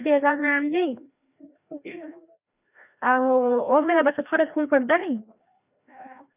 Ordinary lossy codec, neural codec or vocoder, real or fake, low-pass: none; codec, 16 kHz, 1 kbps, FreqCodec, larger model; fake; 3.6 kHz